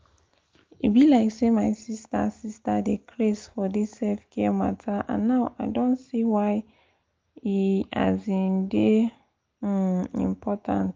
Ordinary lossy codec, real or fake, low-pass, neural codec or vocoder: Opus, 24 kbps; real; 7.2 kHz; none